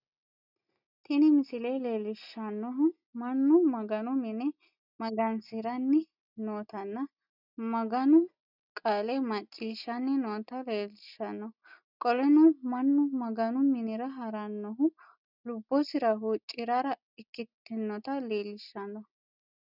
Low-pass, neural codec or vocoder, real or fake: 5.4 kHz; none; real